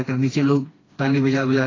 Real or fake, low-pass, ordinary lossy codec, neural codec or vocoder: fake; 7.2 kHz; AAC, 32 kbps; codec, 16 kHz, 2 kbps, FreqCodec, smaller model